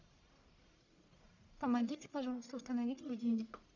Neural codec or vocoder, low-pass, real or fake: codec, 44.1 kHz, 1.7 kbps, Pupu-Codec; 7.2 kHz; fake